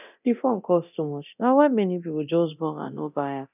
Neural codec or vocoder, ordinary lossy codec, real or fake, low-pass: codec, 24 kHz, 0.9 kbps, DualCodec; none; fake; 3.6 kHz